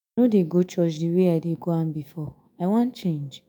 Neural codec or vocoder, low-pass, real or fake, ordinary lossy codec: autoencoder, 48 kHz, 128 numbers a frame, DAC-VAE, trained on Japanese speech; none; fake; none